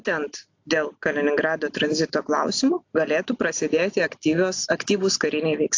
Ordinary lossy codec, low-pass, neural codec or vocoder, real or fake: AAC, 48 kbps; 7.2 kHz; none; real